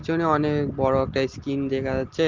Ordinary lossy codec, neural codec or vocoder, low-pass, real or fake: Opus, 16 kbps; none; 7.2 kHz; real